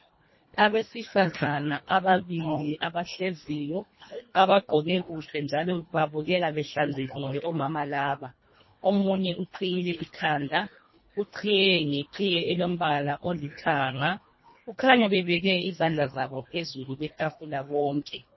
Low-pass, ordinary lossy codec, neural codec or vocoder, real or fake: 7.2 kHz; MP3, 24 kbps; codec, 24 kHz, 1.5 kbps, HILCodec; fake